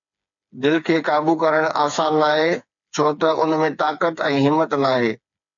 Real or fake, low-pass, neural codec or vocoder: fake; 7.2 kHz; codec, 16 kHz, 4 kbps, FreqCodec, smaller model